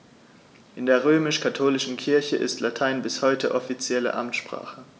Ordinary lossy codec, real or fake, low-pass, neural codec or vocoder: none; real; none; none